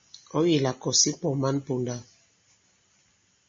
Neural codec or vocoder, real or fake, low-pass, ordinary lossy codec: none; real; 7.2 kHz; MP3, 32 kbps